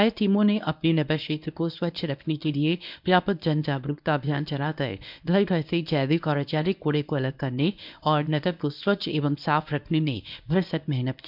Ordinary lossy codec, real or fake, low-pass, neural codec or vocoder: none; fake; 5.4 kHz; codec, 24 kHz, 0.9 kbps, WavTokenizer, medium speech release version 1